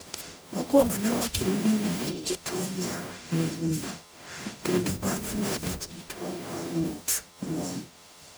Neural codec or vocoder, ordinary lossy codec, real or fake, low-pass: codec, 44.1 kHz, 0.9 kbps, DAC; none; fake; none